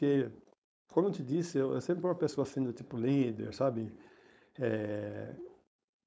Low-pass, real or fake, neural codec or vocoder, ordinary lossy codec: none; fake; codec, 16 kHz, 4.8 kbps, FACodec; none